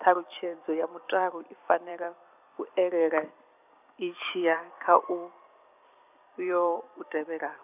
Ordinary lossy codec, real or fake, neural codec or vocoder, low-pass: none; fake; vocoder, 44.1 kHz, 128 mel bands every 256 samples, BigVGAN v2; 3.6 kHz